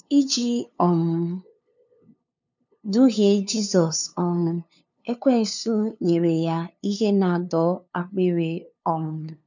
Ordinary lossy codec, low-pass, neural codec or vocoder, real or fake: none; 7.2 kHz; codec, 16 kHz, 2 kbps, FunCodec, trained on LibriTTS, 25 frames a second; fake